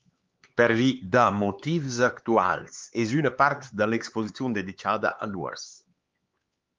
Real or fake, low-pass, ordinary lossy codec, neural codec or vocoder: fake; 7.2 kHz; Opus, 32 kbps; codec, 16 kHz, 4 kbps, X-Codec, HuBERT features, trained on LibriSpeech